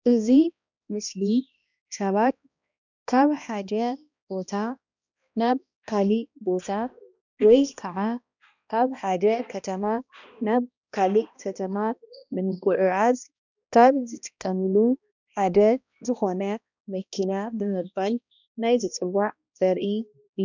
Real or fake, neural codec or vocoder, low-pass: fake; codec, 16 kHz, 1 kbps, X-Codec, HuBERT features, trained on balanced general audio; 7.2 kHz